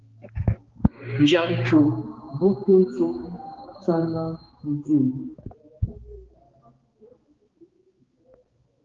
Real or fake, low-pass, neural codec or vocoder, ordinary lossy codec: fake; 7.2 kHz; codec, 16 kHz, 4 kbps, X-Codec, HuBERT features, trained on balanced general audio; Opus, 16 kbps